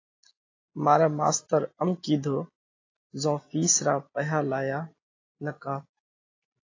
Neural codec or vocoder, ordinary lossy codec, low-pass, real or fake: none; AAC, 32 kbps; 7.2 kHz; real